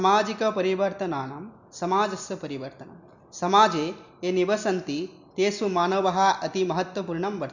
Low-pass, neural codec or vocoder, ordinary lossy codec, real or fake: 7.2 kHz; none; none; real